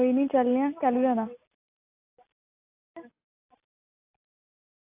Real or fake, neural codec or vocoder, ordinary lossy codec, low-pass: real; none; AAC, 24 kbps; 3.6 kHz